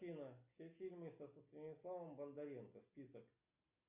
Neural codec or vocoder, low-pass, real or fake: none; 3.6 kHz; real